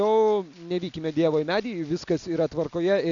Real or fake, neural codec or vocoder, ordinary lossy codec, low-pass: real; none; AAC, 64 kbps; 7.2 kHz